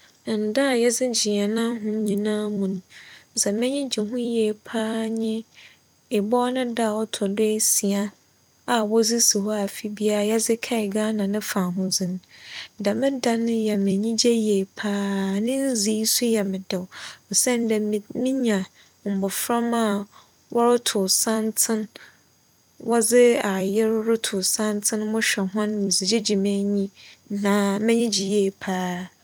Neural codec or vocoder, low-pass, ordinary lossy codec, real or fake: vocoder, 44.1 kHz, 128 mel bands every 256 samples, BigVGAN v2; 19.8 kHz; none; fake